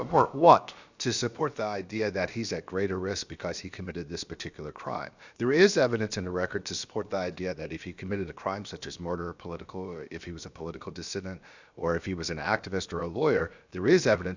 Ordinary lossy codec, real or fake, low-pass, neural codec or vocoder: Opus, 64 kbps; fake; 7.2 kHz; codec, 16 kHz, about 1 kbps, DyCAST, with the encoder's durations